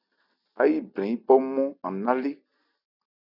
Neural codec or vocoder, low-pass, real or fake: none; 5.4 kHz; real